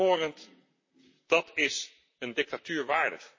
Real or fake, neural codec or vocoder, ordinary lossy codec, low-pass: fake; vocoder, 44.1 kHz, 128 mel bands, Pupu-Vocoder; MP3, 32 kbps; 7.2 kHz